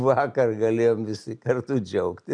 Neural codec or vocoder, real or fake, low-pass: none; real; 9.9 kHz